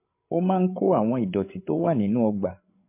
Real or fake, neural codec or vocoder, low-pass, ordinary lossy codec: fake; codec, 16 kHz, 8 kbps, FreqCodec, larger model; 3.6 kHz; MP3, 24 kbps